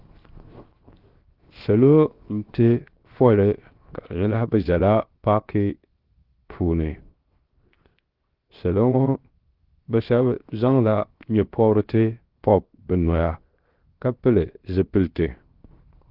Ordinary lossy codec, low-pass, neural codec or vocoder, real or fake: Opus, 16 kbps; 5.4 kHz; codec, 16 kHz, 0.7 kbps, FocalCodec; fake